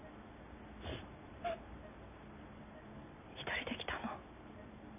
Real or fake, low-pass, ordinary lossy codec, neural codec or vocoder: real; 3.6 kHz; none; none